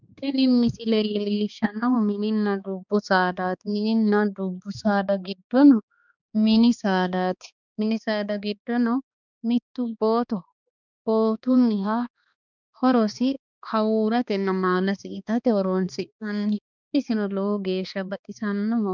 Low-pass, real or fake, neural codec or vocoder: 7.2 kHz; fake; codec, 16 kHz, 2 kbps, X-Codec, HuBERT features, trained on balanced general audio